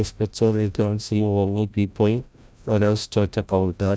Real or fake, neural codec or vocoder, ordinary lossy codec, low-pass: fake; codec, 16 kHz, 0.5 kbps, FreqCodec, larger model; none; none